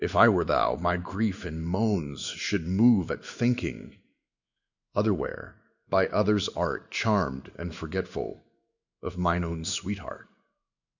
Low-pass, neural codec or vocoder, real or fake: 7.2 kHz; codec, 16 kHz in and 24 kHz out, 1 kbps, XY-Tokenizer; fake